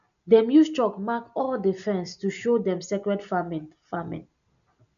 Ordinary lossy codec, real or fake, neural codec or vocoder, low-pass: none; real; none; 7.2 kHz